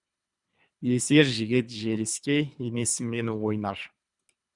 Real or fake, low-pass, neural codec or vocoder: fake; 10.8 kHz; codec, 24 kHz, 3 kbps, HILCodec